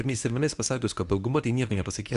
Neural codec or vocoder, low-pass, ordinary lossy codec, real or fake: codec, 24 kHz, 0.9 kbps, WavTokenizer, medium speech release version 1; 10.8 kHz; Opus, 64 kbps; fake